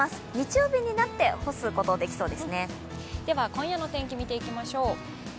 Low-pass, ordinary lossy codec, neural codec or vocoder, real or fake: none; none; none; real